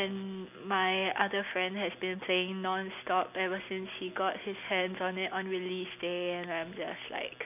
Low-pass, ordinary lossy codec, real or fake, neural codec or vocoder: 3.6 kHz; none; real; none